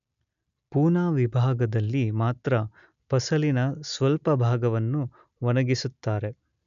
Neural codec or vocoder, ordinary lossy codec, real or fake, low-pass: none; none; real; 7.2 kHz